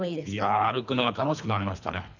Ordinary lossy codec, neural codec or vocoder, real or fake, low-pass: none; codec, 24 kHz, 3 kbps, HILCodec; fake; 7.2 kHz